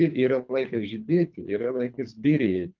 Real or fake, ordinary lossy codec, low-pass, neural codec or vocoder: fake; Opus, 24 kbps; 7.2 kHz; codec, 24 kHz, 3 kbps, HILCodec